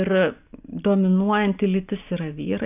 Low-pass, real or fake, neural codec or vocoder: 3.6 kHz; real; none